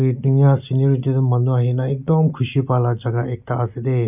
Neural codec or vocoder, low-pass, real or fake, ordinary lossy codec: none; 3.6 kHz; real; none